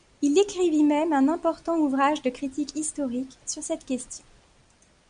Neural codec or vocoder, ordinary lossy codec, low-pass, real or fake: none; AAC, 96 kbps; 9.9 kHz; real